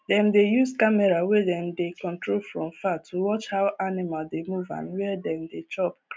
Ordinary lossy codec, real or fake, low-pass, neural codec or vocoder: none; real; none; none